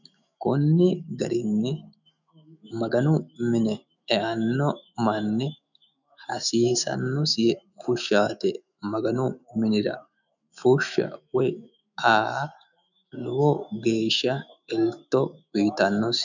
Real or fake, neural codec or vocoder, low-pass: fake; autoencoder, 48 kHz, 128 numbers a frame, DAC-VAE, trained on Japanese speech; 7.2 kHz